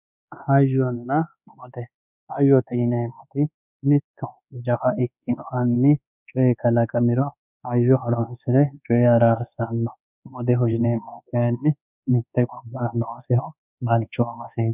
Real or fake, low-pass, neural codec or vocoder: fake; 3.6 kHz; codec, 24 kHz, 1.2 kbps, DualCodec